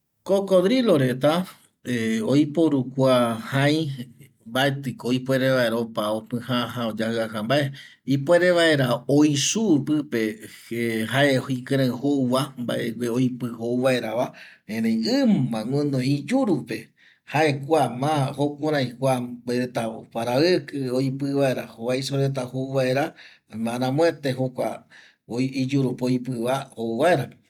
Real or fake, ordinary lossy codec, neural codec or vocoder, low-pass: real; none; none; 19.8 kHz